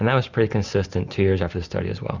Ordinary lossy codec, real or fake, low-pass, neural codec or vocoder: Opus, 64 kbps; real; 7.2 kHz; none